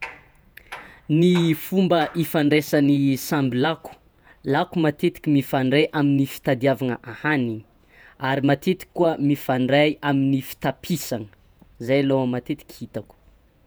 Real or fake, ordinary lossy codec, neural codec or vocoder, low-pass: fake; none; vocoder, 48 kHz, 128 mel bands, Vocos; none